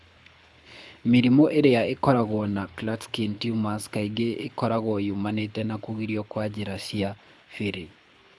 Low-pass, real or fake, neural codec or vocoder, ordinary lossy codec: none; fake; codec, 24 kHz, 6 kbps, HILCodec; none